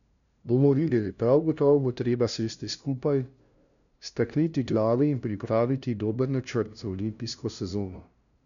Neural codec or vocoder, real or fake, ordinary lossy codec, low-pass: codec, 16 kHz, 0.5 kbps, FunCodec, trained on LibriTTS, 25 frames a second; fake; none; 7.2 kHz